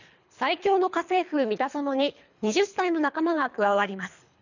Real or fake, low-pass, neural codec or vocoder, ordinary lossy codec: fake; 7.2 kHz; codec, 24 kHz, 3 kbps, HILCodec; none